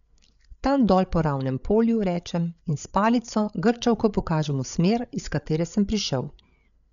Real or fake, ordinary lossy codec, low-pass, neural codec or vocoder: fake; none; 7.2 kHz; codec, 16 kHz, 8 kbps, FreqCodec, larger model